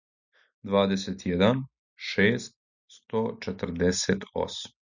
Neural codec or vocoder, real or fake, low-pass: none; real; 7.2 kHz